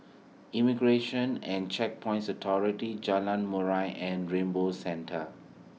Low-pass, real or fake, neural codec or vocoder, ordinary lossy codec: none; real; none; none